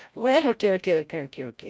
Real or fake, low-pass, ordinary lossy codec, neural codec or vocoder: fake; none; none; codec, 16 kHz, 0.5 kbps, FreqCodec, larger model